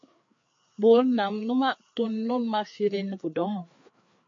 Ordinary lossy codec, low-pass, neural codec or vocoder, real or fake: MP3, 48 kbps; 7.2 kHz; codec, 16 kHz, 4 kbps, FreqCodec, larger model; fake